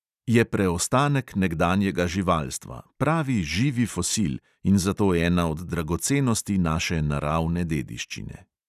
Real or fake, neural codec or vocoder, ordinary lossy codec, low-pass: real; none; none; 14.4 kHz